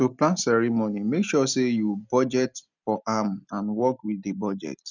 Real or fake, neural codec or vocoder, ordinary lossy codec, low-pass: real; none; none; 7.2 kHz